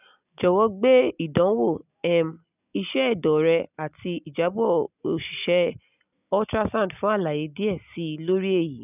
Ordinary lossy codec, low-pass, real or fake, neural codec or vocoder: none; 3.6 kHz; real; none